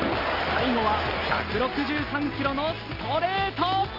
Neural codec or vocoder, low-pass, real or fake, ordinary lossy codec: none; 5.4 kHz; real; Opus, 24 kbps